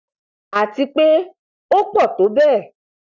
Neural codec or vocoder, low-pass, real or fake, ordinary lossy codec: none; 7.2 kHz; real; none